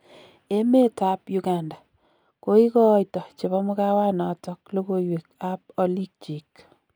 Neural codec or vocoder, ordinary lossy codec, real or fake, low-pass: none; none; real; none